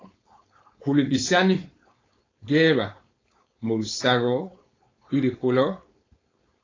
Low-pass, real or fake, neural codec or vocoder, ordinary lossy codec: 7.2 kHz; fake; codec, 16 kHz, 4.8 kbps, FACodec; AAC, 32 kbps